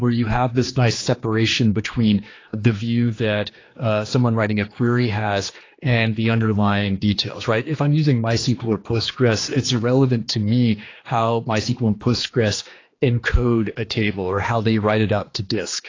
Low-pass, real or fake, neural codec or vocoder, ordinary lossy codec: 7.2 kHz; fake; codec, 16 kHz, 2 kbps, X-Codec, HuBERT features, trained on general audio; AAC, 32 kbps